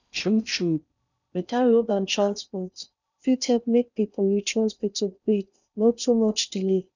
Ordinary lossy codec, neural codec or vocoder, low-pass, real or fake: none; codec, 16 kHz in and 24 kHz out, 0.6 kbps, FocalCodec, streaming, 4096 codes; 7.2 kHz; fake